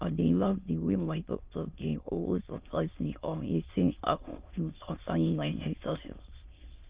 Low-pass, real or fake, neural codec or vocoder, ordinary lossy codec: 3.6 kHz; fake; autoencoder, 22.05 kHz, a latent of 192 numbers a frame, VITS, trained on many speakers; Opus, 32 kbps